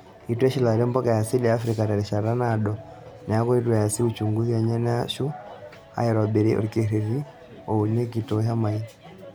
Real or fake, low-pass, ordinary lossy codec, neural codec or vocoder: real; none; none; none